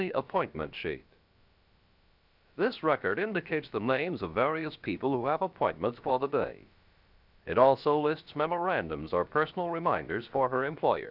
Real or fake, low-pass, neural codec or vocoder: fake; 5.4 kHz; codec, 16 kHz, about 1 kbps, DyCAST, with the encoder's durations